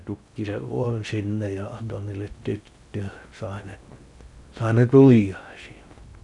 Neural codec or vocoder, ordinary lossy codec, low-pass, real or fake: codec, 16 kHz in and 24 kHz out, 0.6 kbps, FocalCodec, streaming, 2048 codes; none; 10.8 kHz; fake